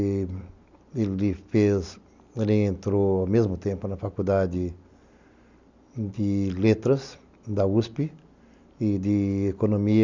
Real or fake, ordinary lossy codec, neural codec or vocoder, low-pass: real; Opus, 64 kbps; none; 7.2 kHz